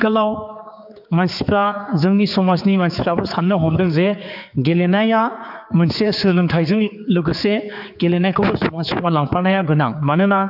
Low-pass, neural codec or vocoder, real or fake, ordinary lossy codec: 5.4 kHz; codec, 16 kHz, 4 kbps, X-Codec, HuBERT features, trained on general audio; fake; AAC, 48 kbps